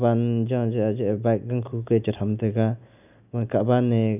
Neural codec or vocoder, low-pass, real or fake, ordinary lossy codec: none; 3.6 kHz; real; none